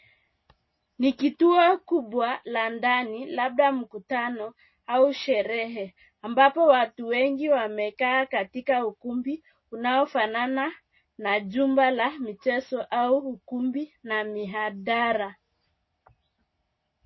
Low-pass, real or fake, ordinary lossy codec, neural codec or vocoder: 7.2 kHz; real; MP3, 24 kbps; none